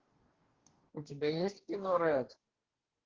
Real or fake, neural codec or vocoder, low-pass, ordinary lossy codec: fake; codec, 44.1 kHz, 2.6 kbps, DAC; 7.2 kHz; Opus, 16 kbps